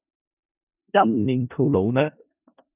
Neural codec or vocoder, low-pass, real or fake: codec, 16 kHz in and 24 kHz out, 0.4 kbps, LongCat-Audio-Codec, four codebook decoder; 3.6 kHz; fake